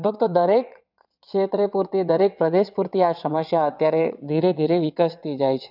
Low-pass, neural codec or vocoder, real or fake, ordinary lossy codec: 5.4 kHz; vocoder, 22.05 kHz, 80 mel bands, WaveNeXt; fake; none